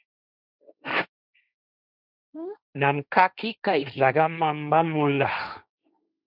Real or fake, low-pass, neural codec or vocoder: fake; 5.4 kHz; codec, 16 kHz, 1.1 kbps, Voila-Tokenizer